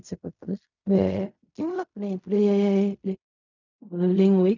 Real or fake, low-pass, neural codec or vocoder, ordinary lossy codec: fake; 7.2 kHz; codec, 16 kHz in and 24 kHz out, 0.4 kbps, LongCat-Audio-Codec, fine tuned four codebook decoder; none